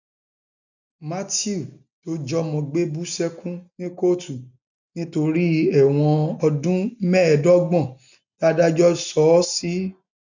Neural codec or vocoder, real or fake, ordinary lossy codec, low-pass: none; real; none; 7.2 kHz